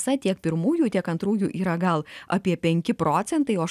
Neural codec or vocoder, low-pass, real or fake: none; 14.4 kHz; real